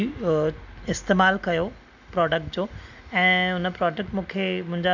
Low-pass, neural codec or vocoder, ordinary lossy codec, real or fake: 7.2 kHz; none; none; real